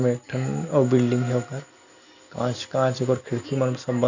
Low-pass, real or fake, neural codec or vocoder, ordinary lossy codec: 7.2 kHz; real; none; none